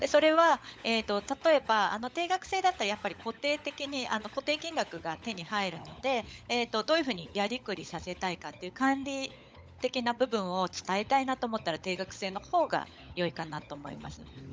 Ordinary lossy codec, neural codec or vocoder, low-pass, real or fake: none; codec, 16 kHz, 16 kbps, FunCodec, trained on LibriTTS, 50 frames a second; none; fake